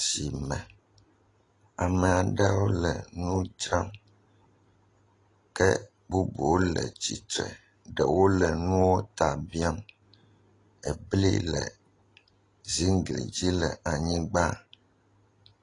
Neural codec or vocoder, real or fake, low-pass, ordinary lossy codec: none; real; 10.8 kHz; AAC, 32 kbps